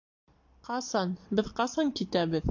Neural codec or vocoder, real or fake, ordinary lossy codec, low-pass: none; real; AAC, 48 kbps; 7.2 kHz